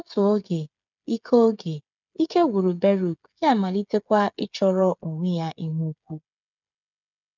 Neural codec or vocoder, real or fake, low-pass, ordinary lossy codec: codec, 16 kHz, 8 kbps, FreqCodec, smaller model; fake; 7.2 kHz; none